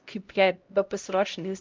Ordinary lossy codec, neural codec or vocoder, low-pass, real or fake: Opus, 24 kbps; codec, 16 kHz, 0.5 kbps, X-Codec, HuBERT features, trained on LibriSpeech; 7.2 kHz; fake